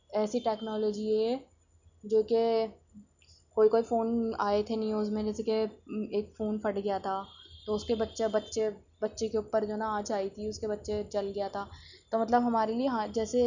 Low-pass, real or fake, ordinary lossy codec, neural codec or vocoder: 7.2 kHz; real; none; none